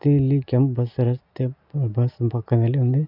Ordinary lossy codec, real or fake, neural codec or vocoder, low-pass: none; real; none; 5.4 kHz